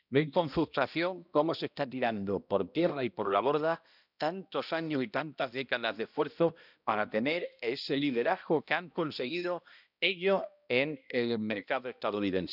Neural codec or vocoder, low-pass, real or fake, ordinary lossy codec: codec, 16 kHz, 1 kbps, X-Codec, HuBERT features, trained on balanced general audio; 5.4 kHz; fake; none